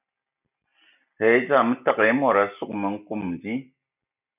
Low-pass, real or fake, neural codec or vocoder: 3.6 kHz; real; none